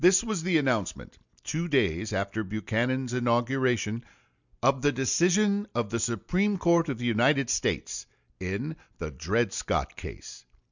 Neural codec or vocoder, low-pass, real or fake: none; 7.2 kHz; real